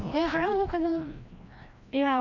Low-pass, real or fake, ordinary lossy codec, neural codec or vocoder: 7.2 kHz; fake; none; codec, 16 kHz, 1 kbps, FreqCodec, larger model